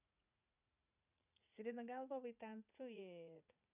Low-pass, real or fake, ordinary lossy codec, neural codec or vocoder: 3.6 kHz; fake; none; vocoder, 44.1 kHz, 80 mel bands, Vocos